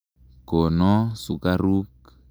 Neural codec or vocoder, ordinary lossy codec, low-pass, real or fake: none; none; none; real